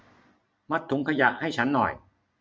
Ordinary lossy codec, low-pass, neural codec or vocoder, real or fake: none; none; none; real